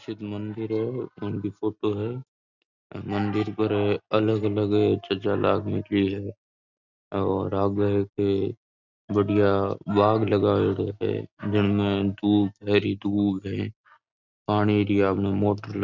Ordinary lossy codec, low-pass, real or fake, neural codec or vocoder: none; 7.2 kHz; real; none